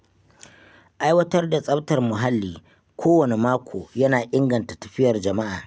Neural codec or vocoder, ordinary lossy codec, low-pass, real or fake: none; none; none; real